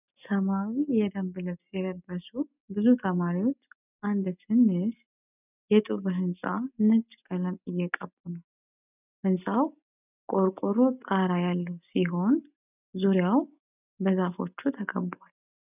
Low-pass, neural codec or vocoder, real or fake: 3.6 kHz; none; real